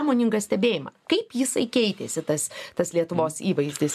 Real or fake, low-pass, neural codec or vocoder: real; 14.4 kHz; none